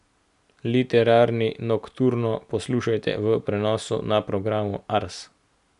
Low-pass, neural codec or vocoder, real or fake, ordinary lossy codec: 10.8 kHz; none; real; none